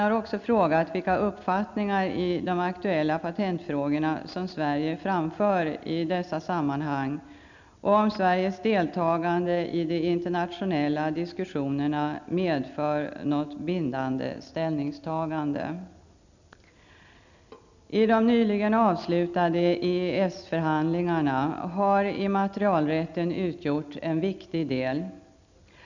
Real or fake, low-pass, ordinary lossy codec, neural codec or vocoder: real; 7.2 kHz; none; none